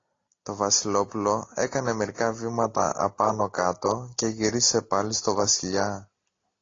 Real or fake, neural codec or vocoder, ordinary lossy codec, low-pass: real; none; AAC, 32 kbps; 7.2 kHz